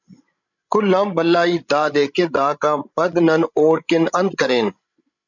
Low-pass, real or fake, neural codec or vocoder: 7.2 kHz; fake; codec, 16 kHz, 16 kbps, FreqCodec, larger model